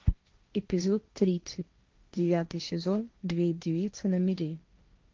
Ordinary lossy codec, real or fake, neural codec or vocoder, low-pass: Opus, 32 kbps; fake; codec, 16 kHz, 1.1 kbps, Voila-Tokenizer; 7.2 kHz